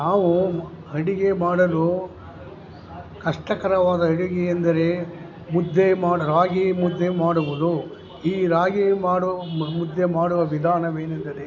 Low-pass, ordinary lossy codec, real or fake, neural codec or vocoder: 7.2 kHz; AAC, 48 kbps; real; none